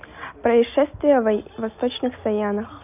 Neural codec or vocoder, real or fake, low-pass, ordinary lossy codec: none; real; 3.6 kHz; none